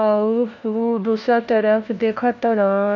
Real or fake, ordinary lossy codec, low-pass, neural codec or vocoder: fake; none; 7.2 kHz; codec, 16 kHz, 1 kbps, FunCodec, trained on LibriTTS, 50 frames a second